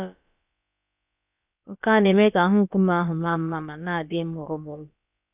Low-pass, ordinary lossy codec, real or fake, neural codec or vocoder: 3.6 kHz; none; fake; codec, 16 kHz, about 1 kbps, DyCAST, with the encoder's durations